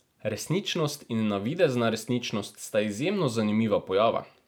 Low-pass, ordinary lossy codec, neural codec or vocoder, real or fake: none; none; none; real